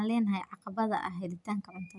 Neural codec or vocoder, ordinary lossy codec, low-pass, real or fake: none; none; 10.8 kHz; real